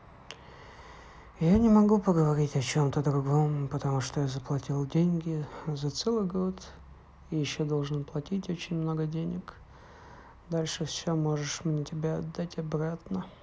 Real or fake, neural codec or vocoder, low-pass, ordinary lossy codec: real; none; none; none